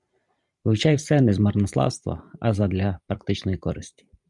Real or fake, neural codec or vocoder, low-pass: fake; vocoder, 44.1 kHz, 128 mel bands every 512 samples, BigVGAN v2; 10.8 kHz